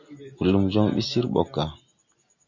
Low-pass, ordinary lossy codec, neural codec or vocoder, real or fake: 7.2 kHz; MP3, 48 kbps; none; real